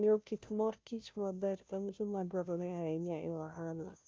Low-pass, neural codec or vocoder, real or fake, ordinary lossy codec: none; codec, 16 kHz, 0.5 kbps, FunCodec, trained on Chinese and English, 25 frames a second; fake; none